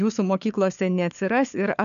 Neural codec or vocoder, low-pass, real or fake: codec, 16 kHz, 4 kbps, FunCodec, trained on LibriTTS, 50 frames a second; 7.2 kHz; fake